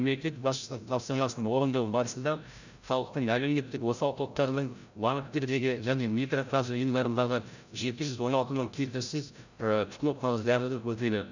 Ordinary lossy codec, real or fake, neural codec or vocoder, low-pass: none; fake; codec, 16 kHz, 0.5 kbps, FreqCodec, larger model; 7.2 kHz